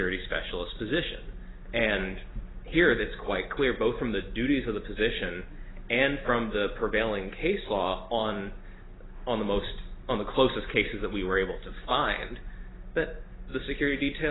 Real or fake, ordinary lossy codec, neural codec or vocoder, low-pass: real; AAC, 16 kbps; none; 7.2 kHz